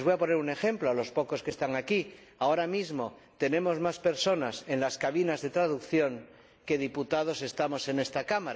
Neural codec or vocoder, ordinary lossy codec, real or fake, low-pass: none; none; real; none